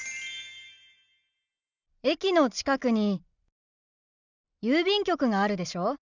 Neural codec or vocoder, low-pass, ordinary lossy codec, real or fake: none; 7.2 kHz; none; real